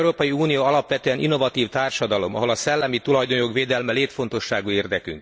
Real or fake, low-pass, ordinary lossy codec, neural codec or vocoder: real; none; none; none